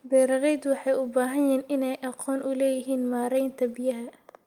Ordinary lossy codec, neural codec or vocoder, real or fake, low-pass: Opus, 32 kbps; none; real; 19.8 kHz